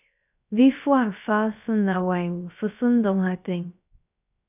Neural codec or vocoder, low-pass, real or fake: codec, 16 kHz, 0.3 kbps, FocalCodec; 3.6 kHz; fake